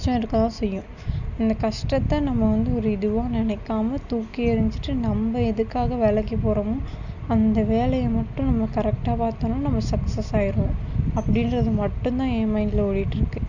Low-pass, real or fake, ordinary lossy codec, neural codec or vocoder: 7.2 kHz; real; none; none